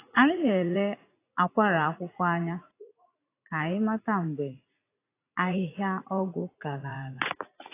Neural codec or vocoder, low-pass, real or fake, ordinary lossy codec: vocoder, 44.1 kHz, 80 mel bands, Vocos; 3.6 kHz; fake; AAC, 24 kbps